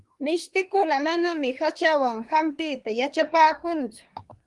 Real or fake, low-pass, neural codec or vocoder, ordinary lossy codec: fake; 10.8 kHz; codec, 24 kHz, 1 kbps, SNAC; Opus, 24 kbps